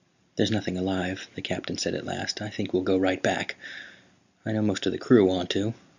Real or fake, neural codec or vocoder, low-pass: real; none; 7.2 kHz